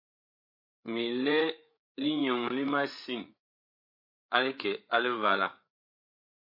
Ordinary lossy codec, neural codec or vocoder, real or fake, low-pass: MP3, 32 kbps; codec, 16 kHz, 8 kbps, FreqCodec, larger model; fake; 5.4 kHz